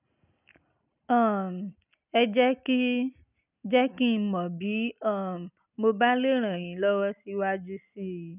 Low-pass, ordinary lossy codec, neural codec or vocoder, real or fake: 3.6 kHz; none; none; real